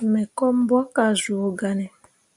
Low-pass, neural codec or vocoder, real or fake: 10.8 kHz; none; real